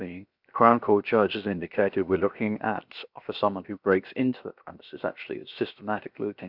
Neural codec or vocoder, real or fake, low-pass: codec, 16 kHz, 0.7 kbps, FocalCodec; fake; 5.4 kHz